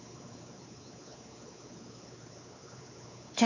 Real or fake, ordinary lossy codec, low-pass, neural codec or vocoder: fake; none; 7.2 kHz; codec, 16 kHz, 4 kbps, X-Codec, WavLM features, trained on Multilingual LibriSpeech